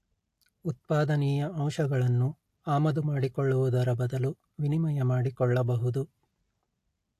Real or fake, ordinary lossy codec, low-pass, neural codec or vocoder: real; AAC, 64 kbps; 14.4 kHz; none